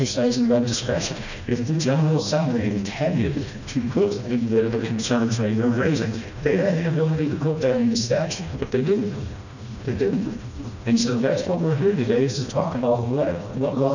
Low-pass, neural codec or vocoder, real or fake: 7.2 kHz; codec, 16 kHz, 1 kbps, FreqCodec, smaller model; fake